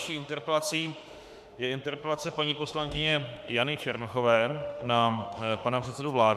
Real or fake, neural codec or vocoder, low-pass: fake; autoencoder, 48 kHz, 32 numbers a frame, DAC-VAE, trained on Japanese speech; 14.4 kHz